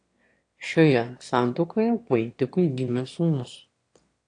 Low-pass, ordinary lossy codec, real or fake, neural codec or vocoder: 9.9 kHz; AAC, 48 kbps; fake; autoencoder, 22.05 kHz, a latent of 192 numbers a frame, VITS, trained on one speaker